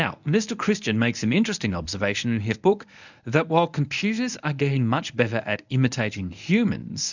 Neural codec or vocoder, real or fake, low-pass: codec, 24 kHz, 0.9 kbps, WavTokenizer, medium speech release version 1; fake; 7.2 kHz